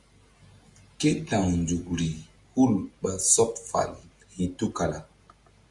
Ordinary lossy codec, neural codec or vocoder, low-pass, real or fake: Opus, 64 kbps; none; 10.8 kHz; real